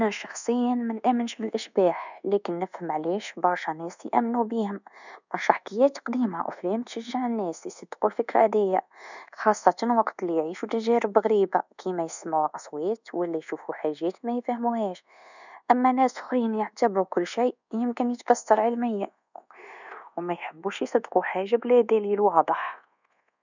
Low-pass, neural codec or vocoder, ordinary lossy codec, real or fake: 7.2 kHz; codec, 24 kHz, 1.2 kbps, DualCodec; none; fake